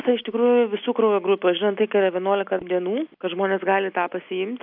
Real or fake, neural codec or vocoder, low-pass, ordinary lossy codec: real; none; 5.4 kHz; AAC, 48 kbps